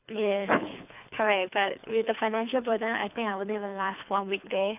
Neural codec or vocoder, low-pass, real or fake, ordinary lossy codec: codec, 24 kHz, 3 kbps, HILCodec; 3.6 kHz; fake; none